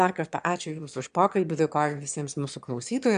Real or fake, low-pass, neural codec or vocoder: fake; 9.9 kHz; autoencoder, 22.05 kHz, a latent of 192 numbers a frame, VITS, trained on one speaker